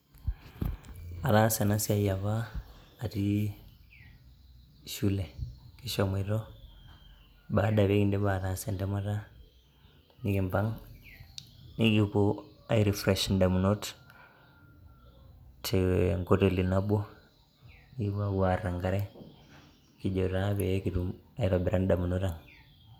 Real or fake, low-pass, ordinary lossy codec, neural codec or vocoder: real; 19.8 kHz; none; none